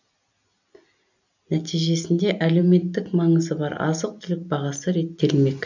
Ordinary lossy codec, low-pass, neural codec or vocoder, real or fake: none; 7.2 kHz; none; real